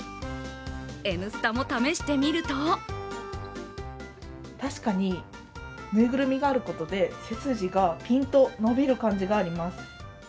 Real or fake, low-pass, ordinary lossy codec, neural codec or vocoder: real; none; none; none